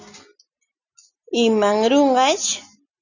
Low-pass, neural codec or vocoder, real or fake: 7.2 kHz; none; real